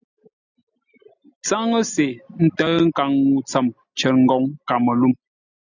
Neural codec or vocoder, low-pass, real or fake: none; 7.2 kHz; real